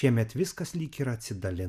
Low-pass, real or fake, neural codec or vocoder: 14.4 kHz; real; none